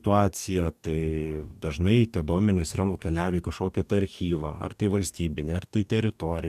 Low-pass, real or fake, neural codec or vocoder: 14.4 kHz; fake; codec, 44.1 kHz, 2.6 kbps, DAC